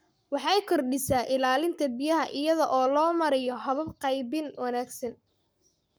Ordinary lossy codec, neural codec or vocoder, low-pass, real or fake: none; codec, 44.1 kHz, 7.8 kbps, Pupu-Codec; none; fake